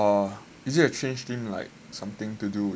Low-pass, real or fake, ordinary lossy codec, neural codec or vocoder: none; real; none; none